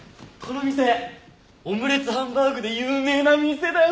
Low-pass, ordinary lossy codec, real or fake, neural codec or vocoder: none; none; real; none